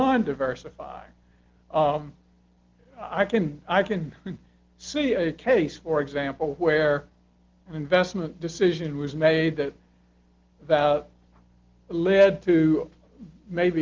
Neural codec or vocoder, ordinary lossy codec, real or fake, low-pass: none; Opus, 16 kbps; real; 7.2 kHz